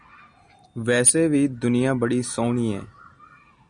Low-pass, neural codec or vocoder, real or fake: 9.9 kHz; none; real